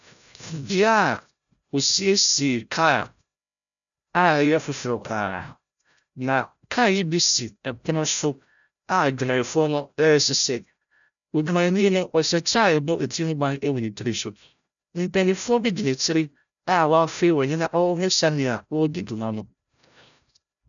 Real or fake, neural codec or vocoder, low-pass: fake; codec, 16 kHz, 0.5 kbps, FreqCodec, larger model; 7.2 kHz